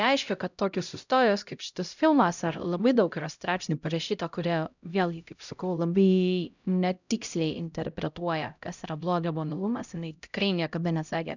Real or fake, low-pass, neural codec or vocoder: fake; 7.2 kHz; codec, 16 kHz, 0.5 kbps, X-Codec, HuBERT features, trained on LibriSpeech